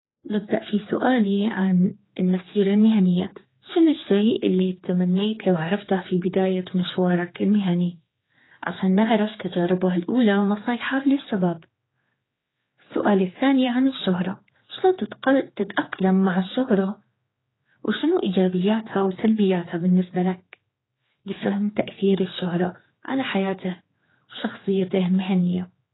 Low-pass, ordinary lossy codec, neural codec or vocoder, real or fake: 7.2 kHz; AAC, 16 kbps; codec, 16 kHz, 4 kbps, X-Codec, HuBERT features, trained on general audio; fake